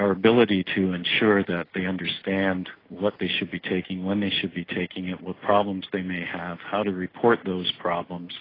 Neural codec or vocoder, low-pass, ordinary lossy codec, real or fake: none; 5.4 kHz; AAC, 24 kbps; real